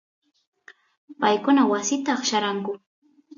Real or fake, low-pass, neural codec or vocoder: real; 7.2 kHz; none